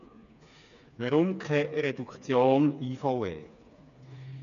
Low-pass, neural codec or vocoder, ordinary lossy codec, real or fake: 7.2 kHz; codec, 16 kHz, 4 kbps, FreqCodec, smaller model; none; fake